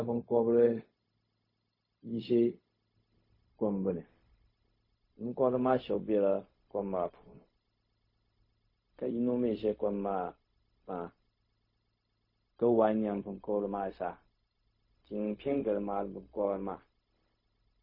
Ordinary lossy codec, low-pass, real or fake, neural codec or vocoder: MP3, 24 kbps; 5.4 kHz; fake; codec, 16 kHz, 0.4 kbps, LongCat-Audio-Codec